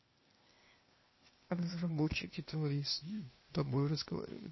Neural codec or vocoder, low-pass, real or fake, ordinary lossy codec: codec, 16 kHz, 0.8 kbps, ZipCodec; 7.2 kHz; fake; MP3, 24 kbps